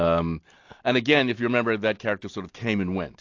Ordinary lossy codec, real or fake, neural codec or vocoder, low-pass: AAC, 48 kbps; real; none; 7.2 kHz